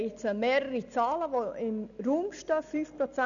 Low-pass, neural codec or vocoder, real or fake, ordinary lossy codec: 7.2 kHz; none; real; none